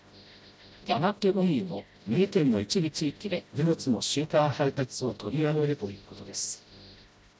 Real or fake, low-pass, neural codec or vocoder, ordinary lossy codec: fake; none; codec, 16 kHz, 0.5 kbps, FreqCodec, smaller model; none